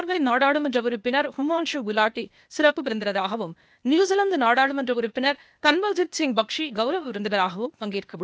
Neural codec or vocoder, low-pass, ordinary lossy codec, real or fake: codec, 16 kHz, 0.8 kbps, ZipCodec; none; none; fake